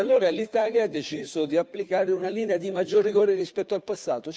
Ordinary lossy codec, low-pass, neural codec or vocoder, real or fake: none; none; codec, 16 kHz, 2 kbps, FunCodec, trained on Chinese and English, 25 frames a second; fake